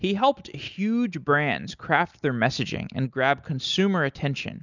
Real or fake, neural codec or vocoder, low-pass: real; none; 7.2 kHz